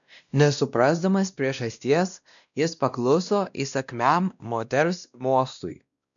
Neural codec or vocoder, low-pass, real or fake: codec, 16 kHz, 1 kbps, X-Codec, WavLM features, trained on Multilingual LibriSpeech; 7.2 kHz; fake